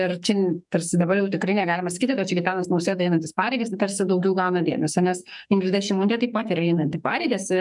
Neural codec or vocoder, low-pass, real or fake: codec, 44.1 kHz, 2.6 kbps, SNAC; 10.8 kHz; fake